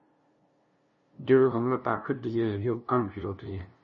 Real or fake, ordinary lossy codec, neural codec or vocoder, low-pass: fake; MP3, 32 kbps; codec, 16 kHz, 0.5 kbps, FunCodec, trained on LibriTTS, 25 frames a second; 7.2 kHz